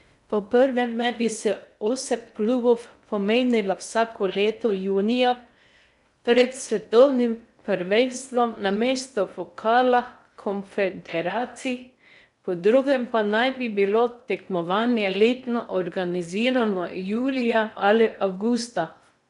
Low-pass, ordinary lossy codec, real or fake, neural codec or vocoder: 10.8 kHz; none; fake; codec, 16 kHz in and 24 kHz out, 0.6 kbps, FocalCodec, streaming, 2048 codes